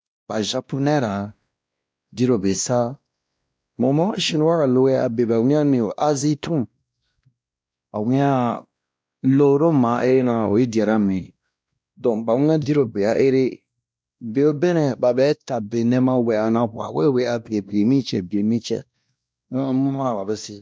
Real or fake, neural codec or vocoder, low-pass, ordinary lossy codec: fake; codec, 16 kHz, 1 kbps, X-Codec, WavLM features, trained on Multilingual LibriSpeech; none; none